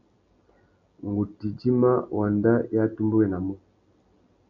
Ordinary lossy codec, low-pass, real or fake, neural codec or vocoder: MP3, 64 kbps; 7.2 kHz; real; none